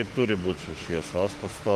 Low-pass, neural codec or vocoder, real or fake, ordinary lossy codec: 14.4 kHz; autoencoder, 48 kHz, 32 numbers a frame, DAC-VAE, trained on Japanese speech; fake; Opus, 24 kbps